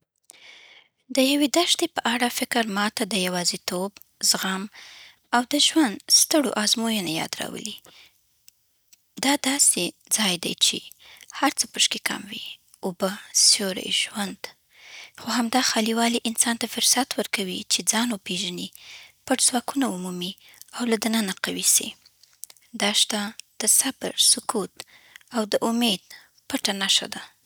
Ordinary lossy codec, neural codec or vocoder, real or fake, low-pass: none; none; real; none